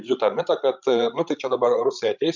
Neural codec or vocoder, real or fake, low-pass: codec, 16 kHz, 16 kbps, FreqCodec, larger model; fake; 7.2 kHz